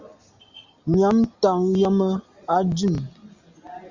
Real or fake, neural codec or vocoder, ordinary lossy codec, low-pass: real; none; Opus, 64 kbps; 7.2 kHz